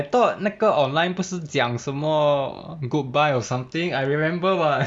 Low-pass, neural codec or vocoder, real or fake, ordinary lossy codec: 9.9 kHz; none; real; none